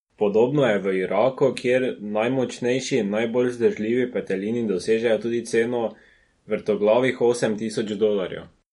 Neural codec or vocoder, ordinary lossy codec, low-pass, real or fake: none; MP3, 48 kbps; 19.8 kHz; real